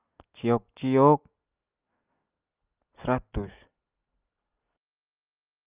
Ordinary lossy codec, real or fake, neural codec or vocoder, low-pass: Opus, 24 kbps; real; none; 3.6 kHz